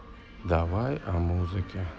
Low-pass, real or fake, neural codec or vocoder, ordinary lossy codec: none; real; none; none